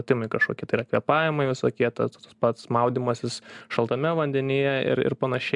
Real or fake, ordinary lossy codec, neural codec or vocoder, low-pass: real; MP3, 96 kbps; none; 10.8 kHz